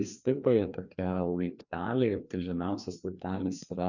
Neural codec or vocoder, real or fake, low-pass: codec, 16 kHz, 2 kbps, FreqCodec, larger model; fake; 7.2 kHz